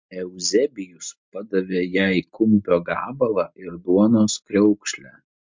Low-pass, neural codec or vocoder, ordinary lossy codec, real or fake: 7.2 kHz; none; MP3, 48 kbps; real